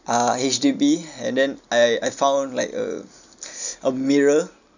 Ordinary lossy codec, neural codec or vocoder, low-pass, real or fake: none; none; 7.2 kHz; real